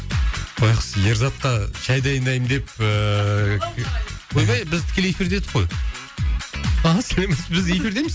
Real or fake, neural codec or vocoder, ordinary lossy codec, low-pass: real; none; none; none